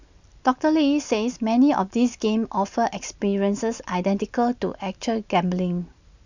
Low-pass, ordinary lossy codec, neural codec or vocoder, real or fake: 7.2 kHz; none; none; real